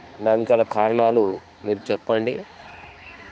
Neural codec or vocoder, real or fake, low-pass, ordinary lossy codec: codec, 16 kHz, 2 kbps, X-Codec, HuBERT features, trained on balanced general audio; fake; none; none